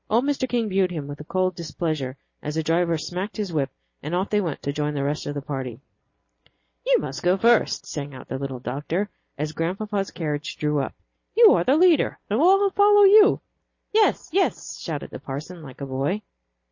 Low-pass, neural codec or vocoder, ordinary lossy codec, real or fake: 7.2 kHz; none; MP3, 32 kbps; real